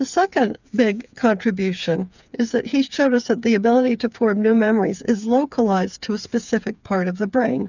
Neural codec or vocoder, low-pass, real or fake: codec, 16 kHz, 8 kbps, FreqCodec, smaller model; 7.2 kHz; fake